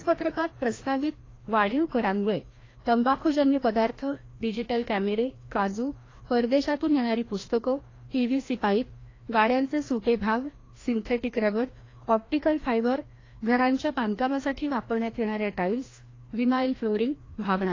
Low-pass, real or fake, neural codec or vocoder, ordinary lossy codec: 7.2 kHz; fake; codec, 16 kHz, 1 kbps, FreqCodec, larger model; AAC, 32 kbps